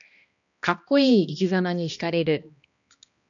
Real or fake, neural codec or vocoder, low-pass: fake; codec, 16 kHz, 1 kbps, X-Codec, HuBERT features, trained on balanced general audio; 7.2 kHz